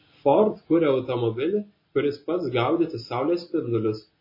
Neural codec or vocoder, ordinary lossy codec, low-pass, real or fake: none; MP3, 24 kbps; 5.4 kHz; real